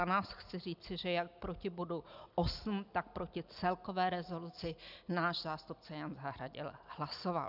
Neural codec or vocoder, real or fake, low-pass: none; real; 5.4 kHz